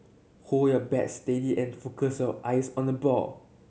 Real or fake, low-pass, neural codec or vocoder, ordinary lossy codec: real; none; none; none